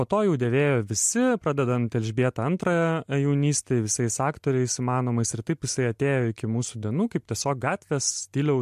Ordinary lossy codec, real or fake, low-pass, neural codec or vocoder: MP3, 64 kbps; real; 14.4 kHz; none